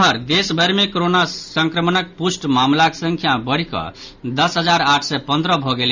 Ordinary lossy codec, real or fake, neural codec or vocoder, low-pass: Opus, 64 kbps; real; none; 7.2 kHz